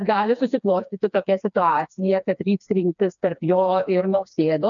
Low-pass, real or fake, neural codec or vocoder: 7.2 kHz; fake; codec, 16 kHz, 2 kbps, FreqCodec, smaller model